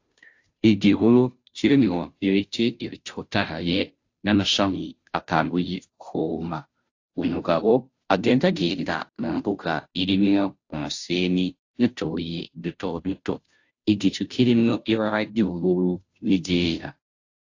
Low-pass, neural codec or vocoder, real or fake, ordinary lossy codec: 7.2 kHz; codec, 16 kHz, 0.5 kbps, FunCodec, trained on Chinese and English, 25 frames a second; fake; AAC, 48 kbps